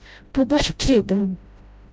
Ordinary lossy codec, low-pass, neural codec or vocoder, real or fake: none; none; codec, 16 kHz, 0.5 kbps, FreqCodec, smaller model; fake